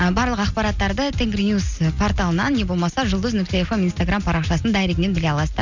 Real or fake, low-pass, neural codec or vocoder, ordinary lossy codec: real; 7.2 kHz; none; none